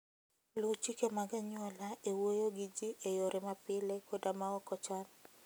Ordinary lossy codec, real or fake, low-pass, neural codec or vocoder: none; real; none; none